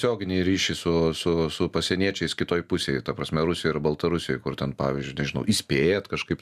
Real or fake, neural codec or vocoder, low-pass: real; none; 14.4 kHz